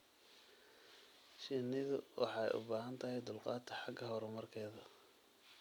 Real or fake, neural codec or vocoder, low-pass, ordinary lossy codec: fake; vocoder, 44.1 kHz, 128 mel bands every 256 samples, BigVGAN v2; none; none